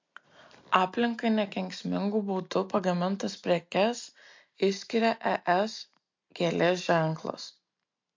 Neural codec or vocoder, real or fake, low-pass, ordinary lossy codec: none; real; 7.2 kHz; MP3, 48 kbps